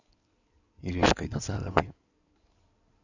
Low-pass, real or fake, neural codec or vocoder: 7.2 kHz; fake; codec, 16 kHz in and 24 kHz out, 2.2 kbps, FireRedTTS-2 codec